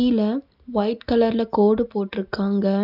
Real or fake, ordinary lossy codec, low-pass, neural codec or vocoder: real; none; 5.4 kHz; none